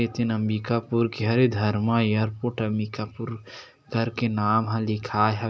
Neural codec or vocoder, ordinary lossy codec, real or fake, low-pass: none; none; real; none